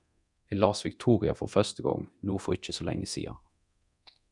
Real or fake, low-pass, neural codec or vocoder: fake; 10.8 kHz; codec, 24 kHz, 0.9 kbps, DualCodec